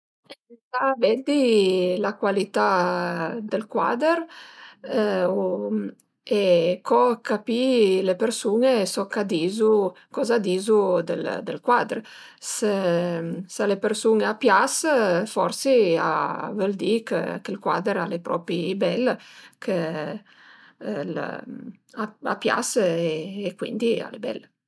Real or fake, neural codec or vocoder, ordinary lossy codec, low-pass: real; none; none; none